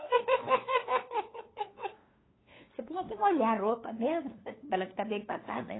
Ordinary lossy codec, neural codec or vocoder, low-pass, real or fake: AAC, 16 kbps; codec, 16 kHz, 2 kbps, FunCodec, trained on LibriTTS, 25 frames a second; 7.2 kHz; fake